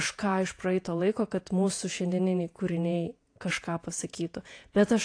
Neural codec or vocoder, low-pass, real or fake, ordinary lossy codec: vocoder, 48 kHz, 128 mel bands, Vocos; 9.9 kHz; fake; AAC, 48 kbps